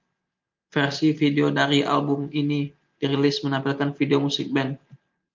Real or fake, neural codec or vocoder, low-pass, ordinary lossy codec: real; none; 7.2 kHz; Opus, 24 kbps